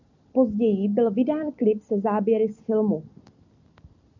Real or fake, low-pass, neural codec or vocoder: real; 7.2 kHz; none